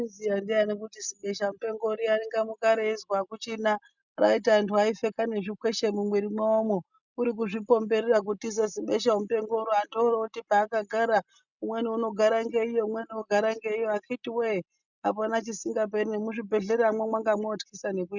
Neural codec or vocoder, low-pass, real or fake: none; 7.2 kHz; real